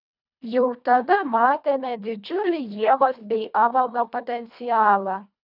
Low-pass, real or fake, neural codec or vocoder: 5.4 kHz; fake; codec, 24 kHz, 1.5 kbps, HILCodec